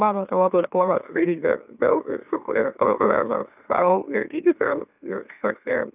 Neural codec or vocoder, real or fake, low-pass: autoencoder, 44.1 kHz, a latent of 192 numbers a frame, MeloTTS; fake; 3.6 kHz